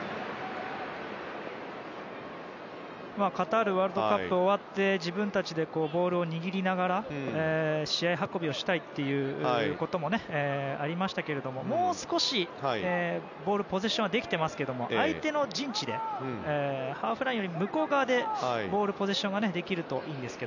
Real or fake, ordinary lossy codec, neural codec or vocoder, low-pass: real; none; none; 7.2 kHz